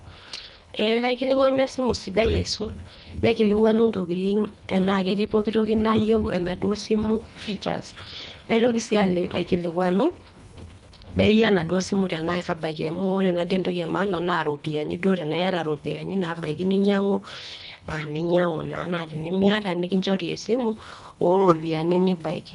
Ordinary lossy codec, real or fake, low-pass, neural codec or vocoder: none; fake; 10.8 kHz; codec, 24 kHz, 1.5 kbps, HILCodec